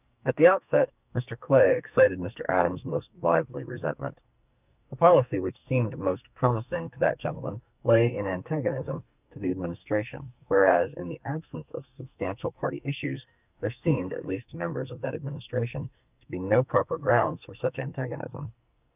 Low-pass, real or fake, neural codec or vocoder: 3.6 kHz; fake; codec, 44.1 kHz, 2.6 kbps, SNAC